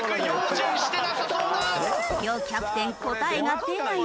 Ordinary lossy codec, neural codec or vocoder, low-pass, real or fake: none; none; none; real